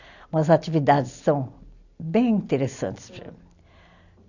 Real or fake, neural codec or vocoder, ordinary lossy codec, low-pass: real; none; none; 7.2 kHz